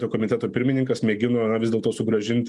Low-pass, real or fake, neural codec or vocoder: 10.8 kHz; real; none